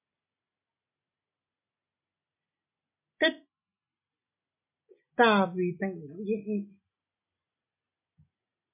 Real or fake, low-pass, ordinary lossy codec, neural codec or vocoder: real; 3.6 kHz; MP3, 32 kbps; none